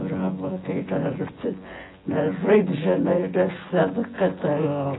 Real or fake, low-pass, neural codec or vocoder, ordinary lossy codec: fake; 7.2 kHz; vocoder, 24 kHz, 100 mel bands, Vocos; AAC, 16 kbps